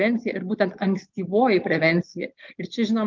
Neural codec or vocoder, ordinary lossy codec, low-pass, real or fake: none; Opus, 32 kbps; 7.2 kHz; real